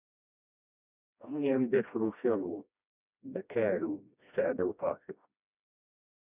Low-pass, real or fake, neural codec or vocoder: 3.6 kHz; fake; codec, 16 kHz, 1 kbps, FreqCodec, smaller model